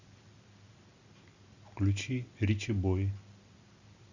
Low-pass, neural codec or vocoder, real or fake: 7.2 kHz; none; real